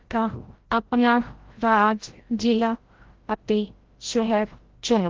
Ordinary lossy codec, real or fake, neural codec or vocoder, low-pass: Opus, 16 kbps; fake; codec, 16 kHz, 0.5 kbps, FreqCodec, larger model; 7.2 kHz